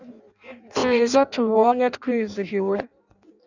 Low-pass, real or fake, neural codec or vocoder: 7.2 kHz; fake; codec, 16 kHz in and 24 kHz out, 0.6 kbps, FireRedTTS-2 codec